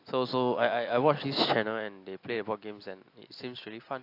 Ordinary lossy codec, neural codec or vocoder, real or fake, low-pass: AAC, 32 kbps; none; real; 5.4 kHz